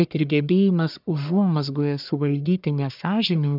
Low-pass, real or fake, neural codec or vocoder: 5.4 kHz; fake; codec, 44.1 kHz, 1.7 kbps, Pupu-Codec